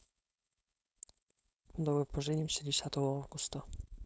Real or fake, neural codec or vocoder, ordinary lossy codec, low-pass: fake; codec, 16 kHz, 4.8 kbps, FACodec; none; none